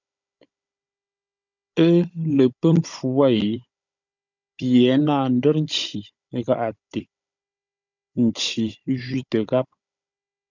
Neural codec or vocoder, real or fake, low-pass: codec, 16 kHz, 16 kbps, FunCodec, trained on Chinese and English, 50 frames a second; fake; 7.2 kHz